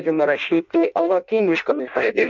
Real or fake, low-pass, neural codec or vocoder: fake; 7.2 kHz; codec, 16 kHz in and 24 kHz out, 0.6 kbps, FireRedTTS-2 codec